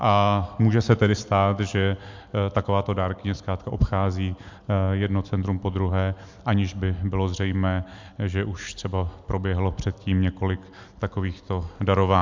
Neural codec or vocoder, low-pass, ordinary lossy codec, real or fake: none; 7.2 kHz; MP3, 64 kbps; real